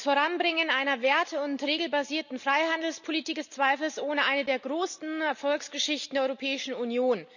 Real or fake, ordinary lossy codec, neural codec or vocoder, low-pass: real; none; none; 7.2 kHz